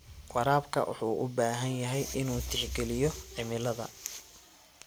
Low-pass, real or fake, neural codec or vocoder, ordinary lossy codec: none; real; none; none